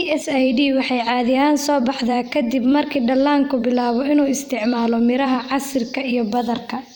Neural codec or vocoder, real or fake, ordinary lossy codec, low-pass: vocoder, 44.1 kHz, 128 mel bands every 256 samples, BigVGAN v2; fake; none; none